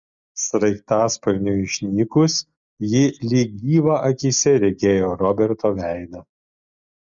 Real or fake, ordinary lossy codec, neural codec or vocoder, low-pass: real; MP3, 64 kbps; none; 7.2 kHz